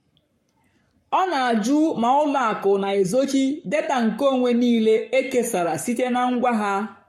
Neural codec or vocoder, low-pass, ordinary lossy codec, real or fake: codec, 44.1 kHz, 7.8 kbps, Pupu-Codec; 19.8 kHz; MP3, 64 kbps; fake